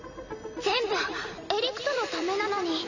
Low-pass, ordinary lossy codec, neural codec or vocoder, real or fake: 7.2 kHz; none; vocoder, 44.1 kHz, 128 mel bands every 256 samples, BigVGAN v2; fake